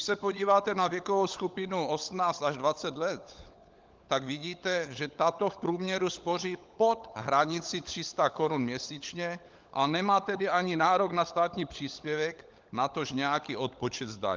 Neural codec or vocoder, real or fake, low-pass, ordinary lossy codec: codec, 16 kHz, 16 kbps, FunCodec, trained on LibriTTS, 50 frames a second; fake; 7.2 kHz; Opus, 24 kbps